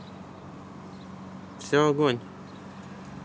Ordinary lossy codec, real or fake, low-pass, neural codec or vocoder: none; real; none; none